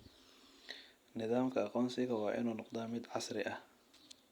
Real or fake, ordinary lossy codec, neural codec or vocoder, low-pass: real; Opus, 64 kbps; none; 19.8 kHz